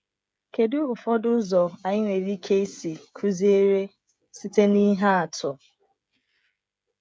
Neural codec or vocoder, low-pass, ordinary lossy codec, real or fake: codec, 16 kHz, 16 kbps, FreqCodec, smaller model; none; none; fake